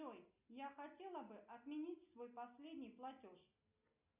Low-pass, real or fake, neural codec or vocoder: 3.6 kHz; real; none